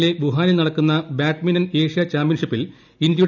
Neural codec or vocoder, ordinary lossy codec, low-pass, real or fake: none; none; 7.2 kHz; real